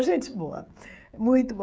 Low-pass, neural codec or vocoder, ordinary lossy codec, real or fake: none; codec, 16 kHz, 16 kbps, FreqCodec, smaller model; none; fake